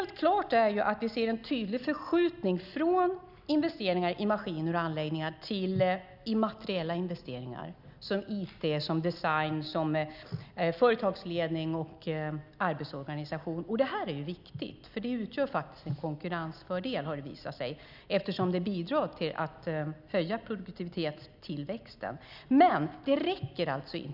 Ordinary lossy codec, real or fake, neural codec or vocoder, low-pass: none; real; none; 5.4 kHz